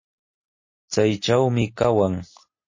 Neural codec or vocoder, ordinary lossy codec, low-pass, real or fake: none; MP3, 32 kbps; 7.2 kHz; real